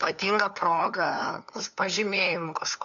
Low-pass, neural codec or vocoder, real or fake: 7.2 kHz; codec, 16 kHz, 2 kbps, FunCodec, trained on LibriTTS, 25 frames a second; fake